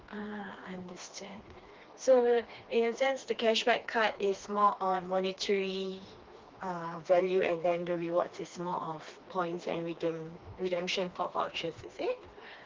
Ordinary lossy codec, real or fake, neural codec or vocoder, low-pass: Opus, 32 kbps; fake; codec, 16 kHz, 2 kbps, FreqCodec, smaller model; 7.2 kHz